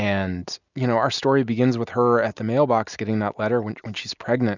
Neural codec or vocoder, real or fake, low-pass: none; real; 7.2 kHz